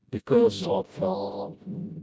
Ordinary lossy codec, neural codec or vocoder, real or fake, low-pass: none; codec, 16 kHz, 0.5 kbps, FreqCodec, smaller model; fake; none